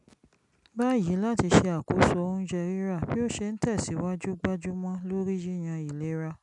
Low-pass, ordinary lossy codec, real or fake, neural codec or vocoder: 10.8 kHz; none; real; none